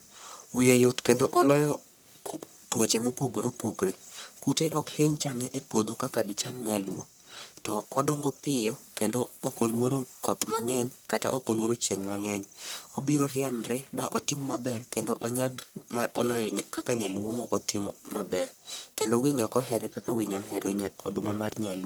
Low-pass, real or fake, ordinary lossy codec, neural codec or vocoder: none; fake; none; codec, 44.1 kHz, 1.7 kbps, Pupu-Codec